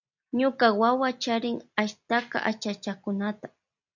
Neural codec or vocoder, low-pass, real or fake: none; 7.2 kHz; real